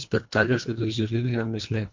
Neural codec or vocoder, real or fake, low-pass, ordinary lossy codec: codec, 24 kHz, 1.5 kbps, HILCodec; fake; 7.2 kHz; MP3, 48 kbps